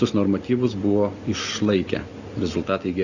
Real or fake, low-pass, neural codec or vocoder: real; 7.2 kHz; none